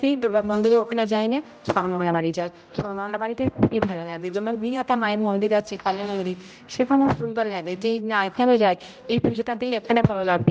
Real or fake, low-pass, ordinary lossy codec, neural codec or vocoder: fake; none; none; codec, 16 kHz, 0.5 kbps, X-Codec, HuBERT features, trained on general audio